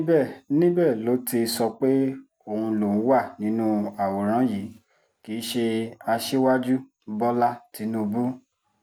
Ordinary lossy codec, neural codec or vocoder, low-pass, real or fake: none; none; none; real